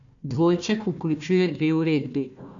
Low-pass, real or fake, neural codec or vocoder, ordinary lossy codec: 7.2 kHz; fake; codec, 16 kHz, 1 kbps, FunCodec, trained on Chinese and English, 50 frames a second; none